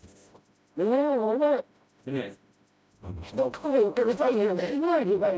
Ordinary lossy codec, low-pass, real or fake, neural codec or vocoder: none; none; fake; codec, 16 kHz, 0.5 kbps, FreqCodec, smaller model